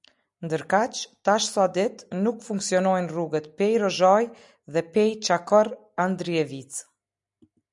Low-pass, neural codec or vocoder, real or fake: 10.8 kHz; none; real